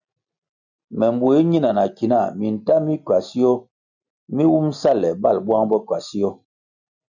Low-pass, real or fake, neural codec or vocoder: 7.2 kHz; real; none